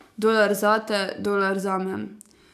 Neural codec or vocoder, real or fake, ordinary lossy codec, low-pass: codec, 44.1 kHz, 7.8 kbps, DAC; fake; none; 14.4 kHz